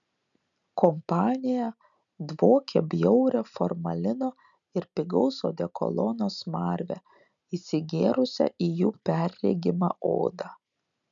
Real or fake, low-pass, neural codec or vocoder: real; 7.2 kHz; none